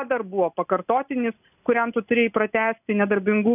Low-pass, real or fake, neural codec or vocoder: 3.6 kHz; real; none